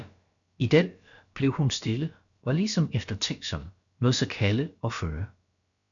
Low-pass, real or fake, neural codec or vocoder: 7.2 kHz; fake; codec, 16 kHz, about 1 kbps, DyCAST, with the encoder's durations